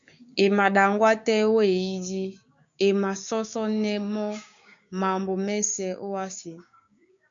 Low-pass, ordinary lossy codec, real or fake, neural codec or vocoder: 7.2 kHz; MP3, 64 kbps; fake; codec, 16 kHz, 6 kbps, DAC